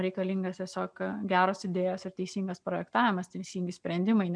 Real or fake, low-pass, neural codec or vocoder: real; 9.9 kHz; none